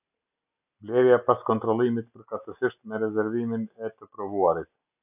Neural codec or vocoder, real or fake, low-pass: none; real; 3.6 kHz